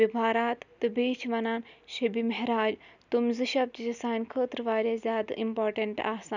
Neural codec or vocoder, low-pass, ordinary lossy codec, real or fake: none; 7.2 kHz; none; real